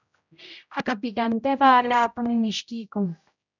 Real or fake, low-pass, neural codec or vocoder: fake; 7.2 kHz; codec, 16 kHz, 0.5 kbps, X-Codec, HuBERT features, trained on general audio